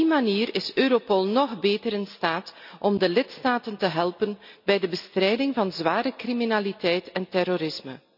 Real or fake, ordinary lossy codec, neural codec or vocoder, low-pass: real; none; none; 5.4 kHz